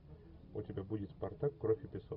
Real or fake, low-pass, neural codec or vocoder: real; 5.4 kHz; none